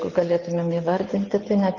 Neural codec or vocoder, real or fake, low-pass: none; real; 7.2 kHz